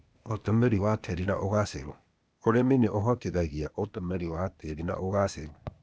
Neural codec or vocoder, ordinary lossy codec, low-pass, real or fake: codec, 16 kHz, 0.8 kbps, ZipCodec; none; none; fake